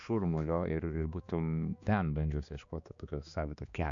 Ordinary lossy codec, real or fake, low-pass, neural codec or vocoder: MP3, 64 kbps; fake; 7.2 kHz; codec, 16 kHz, 2 kbps, X-Codec, HuBERT features, trained on balanced general audio